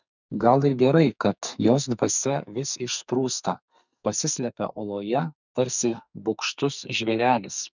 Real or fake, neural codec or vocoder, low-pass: fake; codec, 44.1 kHz, 2.6 kbps, SNAC; 7.2 kHz